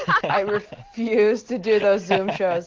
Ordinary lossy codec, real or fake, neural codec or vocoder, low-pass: Opus, 16 kbps; real; none; 7.2 kHz